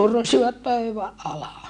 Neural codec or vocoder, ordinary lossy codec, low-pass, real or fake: none; none; 10.8 kHz; real